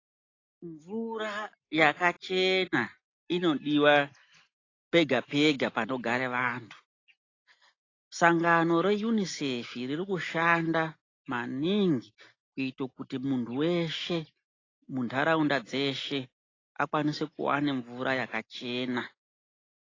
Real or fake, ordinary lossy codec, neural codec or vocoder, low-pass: real; AAC, 32 kbps; none; 7.2 kHz